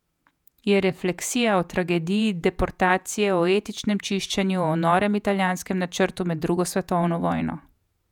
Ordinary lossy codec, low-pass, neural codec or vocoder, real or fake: none; 19.8 kHz; vocoder, 48 kHz, 128 mel bands, Vocos; fake